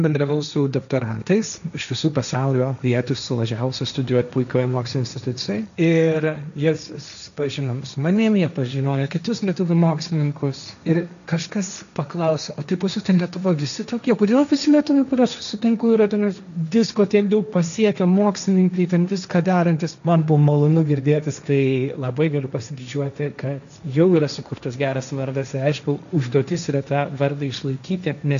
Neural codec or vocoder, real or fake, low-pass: codec, 16 kHz, 1.1 kbps, Voila-Tokenizer; fake; 7.2 kHz